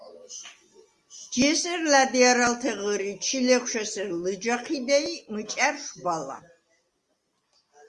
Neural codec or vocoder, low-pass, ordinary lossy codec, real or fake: none; 10.8 kHz; Opus, 32 kbps; real